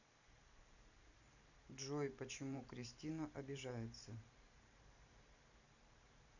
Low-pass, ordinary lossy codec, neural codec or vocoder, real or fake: 7.2 kHz; none; vocoder, 44.1 kHz, 128 mel bands every 512 samples, BigVGAN v2; fake